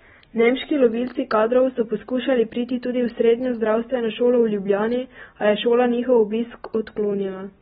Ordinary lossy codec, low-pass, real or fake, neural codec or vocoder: AAC, 16 kbps; 19.8 kHz; real; none